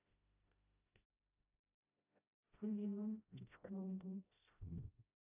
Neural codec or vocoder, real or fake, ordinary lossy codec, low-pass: codec, 16 kHz, 0.5 kbps, FreqCodec, smaller model; fake; AAC, 24 kbps; 3.6 kHz